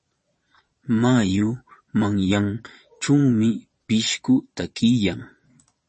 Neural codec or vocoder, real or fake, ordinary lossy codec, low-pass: vocoder, 22.05 kHz, 80 mel bands, Vocos; fake; MP3, 32 kbps; 9.9 kHz